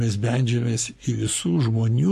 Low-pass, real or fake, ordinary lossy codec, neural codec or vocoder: 14.4 kHz; fake; MP3, 64 kbps; codec, 44.1 kHz, 7.8 kbps, Pupu-Codec